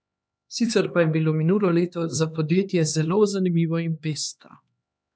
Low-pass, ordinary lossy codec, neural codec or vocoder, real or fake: none; none; codec, 16 kHz, 2 kbps, X-Codec, HuBERT features, trained on LibriSpeech; fake